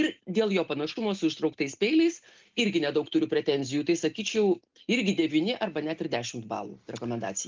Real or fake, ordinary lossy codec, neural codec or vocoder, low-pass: real; Opus, 32 kbps; none; 7.2 kHz